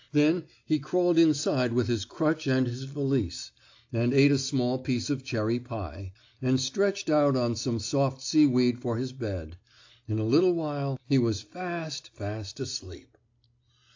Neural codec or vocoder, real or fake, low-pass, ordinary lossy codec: none; real; 7.2 kHz; AAC, 48 kbps